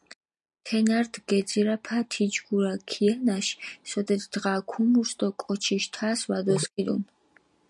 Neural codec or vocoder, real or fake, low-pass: none; real; 10.8 kHz